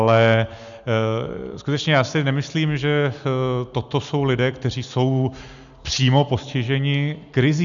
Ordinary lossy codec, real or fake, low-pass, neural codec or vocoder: MP3, 96 kbps; real; 7.2 kHz; none